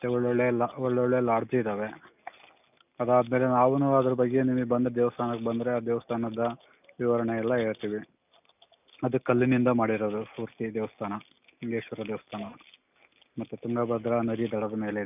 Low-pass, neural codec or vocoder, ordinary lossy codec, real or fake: 3.6 kHz; none; none; real